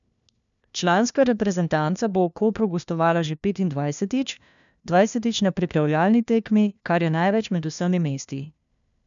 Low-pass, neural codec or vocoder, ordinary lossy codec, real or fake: 7.2 kHz; codec, 16 kHz, 1 kbps, FunCodec, trained on LibriTTS, 50 frames a second; none; fake